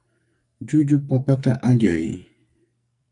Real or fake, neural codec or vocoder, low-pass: fake; codec, 44.1 kHz, 2.6 kbps, SNAC; 10.8 kHz